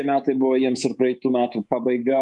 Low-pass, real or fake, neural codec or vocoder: 10.8 kHz; fake; codec, 24 kHz, 3.1 kbps, DualCodec